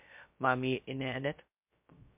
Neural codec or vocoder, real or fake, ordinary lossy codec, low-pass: codec, 16 kHz, 0.2 kbps, FocalCodec; fake; MP3, 32 kbps; 3.6 kHz